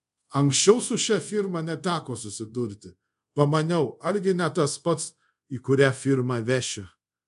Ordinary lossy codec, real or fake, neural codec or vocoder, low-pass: MP3, 64 kbps; fake; codec, 24 kHz, 0.5 kbps, DualCodec; 10.8 kHz